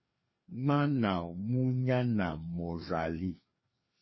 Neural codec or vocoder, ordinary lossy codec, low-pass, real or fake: codec, 16 kHz, 2 kbps, FreqCodec, larger model; MP3, 24 kbps; 7.2 kHz; fake